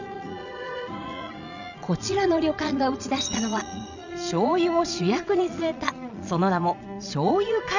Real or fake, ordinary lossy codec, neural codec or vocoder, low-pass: fake; none; vocoder, 22.05 kHz, 80 mel bands, Vocos; 7.2 kHz